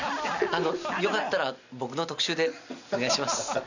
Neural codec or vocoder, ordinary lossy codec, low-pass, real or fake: none; none; 7.2 kHz; real